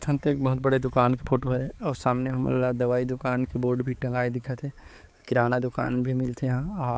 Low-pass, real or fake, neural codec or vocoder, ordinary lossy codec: none; fake; codec, 16 kHz, 4 kbps, X-Codec, HuBERT features, trained on balanced general audio; none